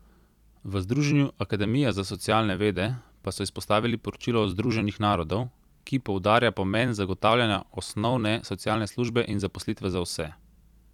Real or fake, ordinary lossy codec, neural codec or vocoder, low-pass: fake; none; vocoder, 44.1 kHz, 128 mel bands every 256 samples, BigVGAN v2; 19.8 kHz